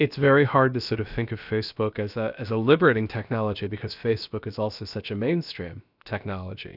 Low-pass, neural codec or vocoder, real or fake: 5.4 kHz; codec, 16 kHz, about 1 kbps, DyCAST, with the encoder's durations; fake